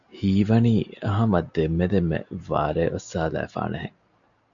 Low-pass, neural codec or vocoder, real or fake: 7.2 kHz; none; real